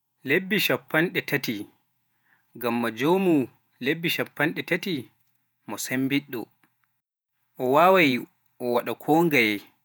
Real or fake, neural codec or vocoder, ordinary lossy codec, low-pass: fake; vocoder, 48 kHz, 128 mel bands, Vocos; none; none